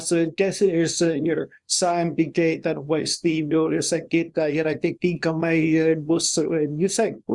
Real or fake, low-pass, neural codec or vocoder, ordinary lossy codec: fake; 10.8 kHz; codec, 24 kHz, 0.9 kbps, WavTokenizer, small release; Opus, 64 kbps